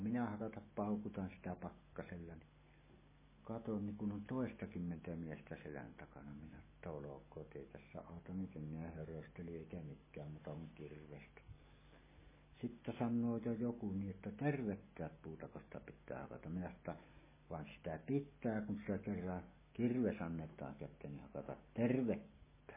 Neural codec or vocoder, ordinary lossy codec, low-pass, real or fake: none; MP3, 16 kbps; 3.6 kHz; real